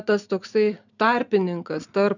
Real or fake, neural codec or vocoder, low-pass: real; none; 7.2 kHz